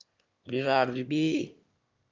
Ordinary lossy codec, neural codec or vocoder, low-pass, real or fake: Opus, 32 kbps; autoencoder, 22.05 kHz, a latent of 192 numbers a frame, VITS, trained on one speaker; 7.2 kHz; fake